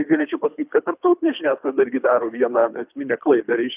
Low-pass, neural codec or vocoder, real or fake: 3.6 kHz; codec, 24 kHz, 3 kbps, HILCodec; fake